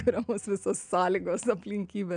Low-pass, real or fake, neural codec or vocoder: 9.9 kHz; real; none